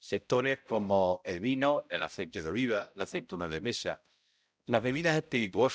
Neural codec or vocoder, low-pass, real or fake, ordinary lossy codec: codec, 16 kHz, 0.5 kbps, X-Codec, HuBERT features, trained on balanced general audio; none; fake; none